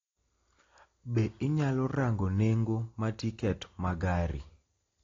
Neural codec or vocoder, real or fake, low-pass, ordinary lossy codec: none; real; 7.2 kHz; AAC, 32 kbps